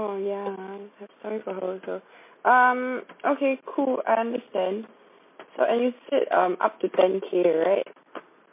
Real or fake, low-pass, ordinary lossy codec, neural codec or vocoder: real; 3.6 kHz; MP3, 24 kbps; none